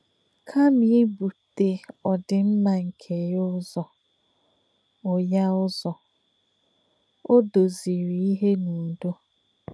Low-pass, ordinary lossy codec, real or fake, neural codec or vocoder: none; none; real; none